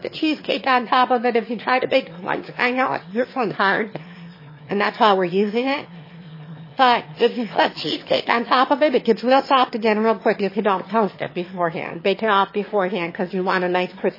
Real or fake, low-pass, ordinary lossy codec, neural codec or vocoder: fake; 5.4 kHz; MP3, 24 kbps; autoencoder, 22.05 kHz, a latent of 192 numbers a frame, VITS, trained on one speaker